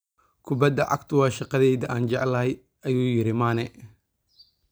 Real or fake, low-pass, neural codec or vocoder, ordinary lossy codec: real; none; none; none